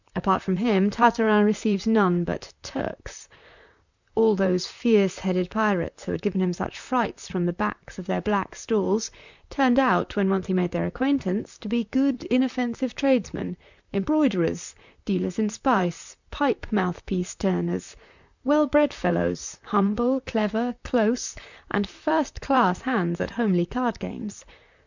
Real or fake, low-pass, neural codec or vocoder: fake; 7.2 kHz; vocoder, 44.1 kHz, 128 mel bands, Pupu-Vocoder